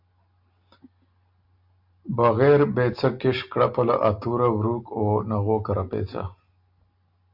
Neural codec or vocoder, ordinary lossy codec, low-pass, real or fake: none; MP3, 48 kbps; 5.4 kHz; real